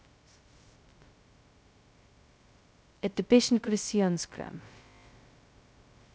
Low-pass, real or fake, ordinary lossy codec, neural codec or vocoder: none; fake; none; codec, 16 kHz, 0.2 kbps, FocalCodec